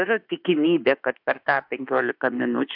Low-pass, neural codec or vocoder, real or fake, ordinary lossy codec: 5.4 kHz; autoencoder, 48 kHz, 32 numbers a frame, DAC-VAE, trained on Japanese speech; fake; AAC, 48 kbps